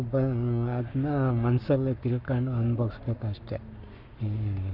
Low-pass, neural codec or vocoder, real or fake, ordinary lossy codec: 5.4 kHz; codec, 44.1 kHz, 7.8 kbps, Pupu-Codec; fake; none